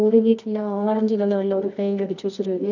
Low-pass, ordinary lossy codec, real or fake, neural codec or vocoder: 7.2 kHz; none; fake; codec, 24 kHz, 0.9 kbps, WavTokenizer, medium music audio release